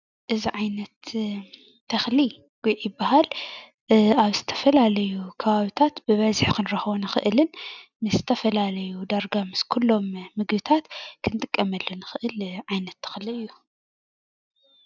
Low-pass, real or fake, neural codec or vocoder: 7.2 kHz; real; none